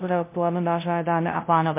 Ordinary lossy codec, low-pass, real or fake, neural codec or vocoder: MP3, 24 kbps; 3.6 kHz; fake; codec, 16 kHz, 0.5 kbps, FunCodec, trained on LibriTTS, 25 frames a second